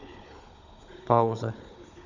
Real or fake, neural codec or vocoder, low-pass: fake; codec, 16 kHz, 16 kbps, FunCodec, trained on Chinese and English, 50 frames a second; 7.2 kHz